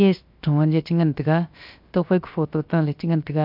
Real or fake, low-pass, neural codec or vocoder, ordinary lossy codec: fake; 5.4 kHz; codec, 16 kHz, about 1 kbps, DyCAST, with the encoder's durations; MP3, 48 kbps